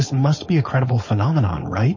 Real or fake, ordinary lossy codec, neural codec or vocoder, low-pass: fake; MP3, 32 kbps; codec, 16 kHz, 4.8 kbps, FACodec; 7.2 kHz